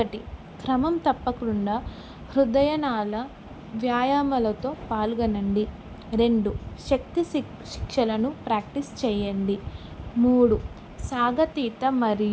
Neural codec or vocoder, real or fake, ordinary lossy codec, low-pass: none; real; none; none